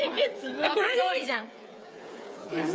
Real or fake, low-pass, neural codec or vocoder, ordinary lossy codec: fake; none; codec, 16 kHz, 8 kbps, FreqCodec, smaller model; none